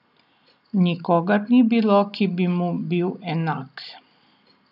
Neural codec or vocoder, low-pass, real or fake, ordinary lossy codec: none; 5.4 kHz; real; none